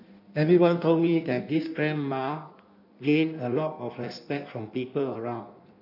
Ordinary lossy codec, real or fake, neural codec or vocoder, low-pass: none; fake; codec, 16 kHz in and 24 kHz out, 1.1 kbps, FireRedTTS-2 codec; 5.4 kHz